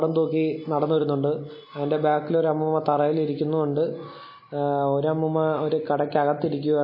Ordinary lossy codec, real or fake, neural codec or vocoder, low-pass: MP3, 24 kbps; real; none; 5.4 kHz